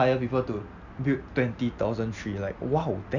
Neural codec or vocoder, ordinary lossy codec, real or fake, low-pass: none; none; real; 7.2 kHz